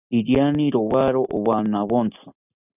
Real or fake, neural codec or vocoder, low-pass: real; none; 3.6 kHz